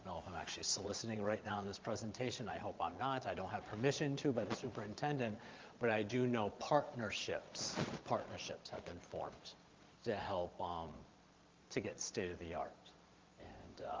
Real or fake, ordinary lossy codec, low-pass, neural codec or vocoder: fake; Opus, 32 kbps; 7.2 kHz; vocoder, 22.05 kHz, 80 mel bands, Vocos